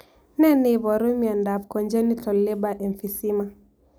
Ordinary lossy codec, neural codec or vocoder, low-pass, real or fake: none; none; none; real